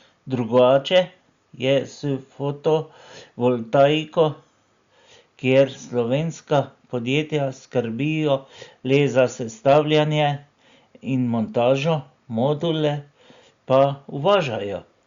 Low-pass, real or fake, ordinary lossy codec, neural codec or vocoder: 7.2 kHz; real; Opus, 64 kbps; none